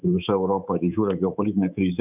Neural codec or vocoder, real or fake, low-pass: codec, 24 kHz, 3.1 kbps, DualCodec; fake; 3.6 kHz